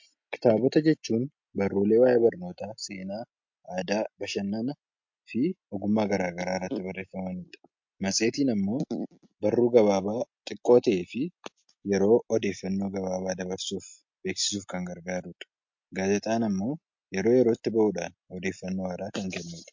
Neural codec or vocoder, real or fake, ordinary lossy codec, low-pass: none; real; MP3, 48 kbps; 7.2 kHz